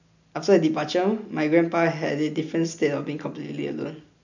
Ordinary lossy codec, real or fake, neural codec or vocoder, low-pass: none; real; none; 7.2 kHz